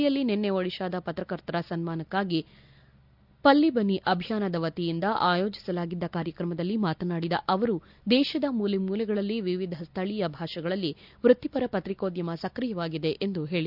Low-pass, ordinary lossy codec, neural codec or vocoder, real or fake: 5.4 kHz; none; none; real